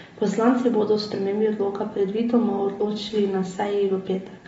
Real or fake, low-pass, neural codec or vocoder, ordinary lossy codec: real; 19.8 kHz; none; AAC, 24 kbps